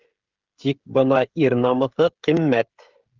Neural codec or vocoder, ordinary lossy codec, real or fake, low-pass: codec, 16 kHz, 16 kbps, FreqCodec, smaller model; Opus, 16 kbps; fake; 7.2 kHz